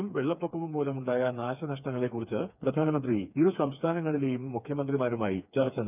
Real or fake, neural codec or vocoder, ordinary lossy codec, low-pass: fake; codec, 16 kHz, 4 kbps, FreqCodec, smaller model; none; 3.6 kHz